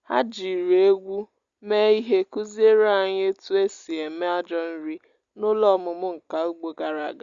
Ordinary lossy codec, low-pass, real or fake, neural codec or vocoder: Opus, 64 kbps; 7.2 kHz; real; none